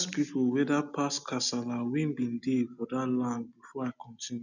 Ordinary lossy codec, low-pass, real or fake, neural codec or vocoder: none; 7.2 kHz; real; none